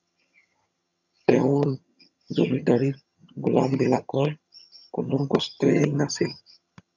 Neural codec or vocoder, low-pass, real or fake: vocoder, 22.05 kHz, 80 mel bands, HiFi-GAN; 7.2 kHz; fake